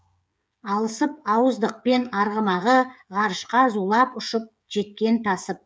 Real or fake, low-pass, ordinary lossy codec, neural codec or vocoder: fake; none; none; codec, 16 kHz, 16 kbps, FreqCodec, smaller model